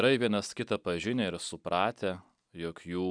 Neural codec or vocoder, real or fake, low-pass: none; real; 9.9 kHz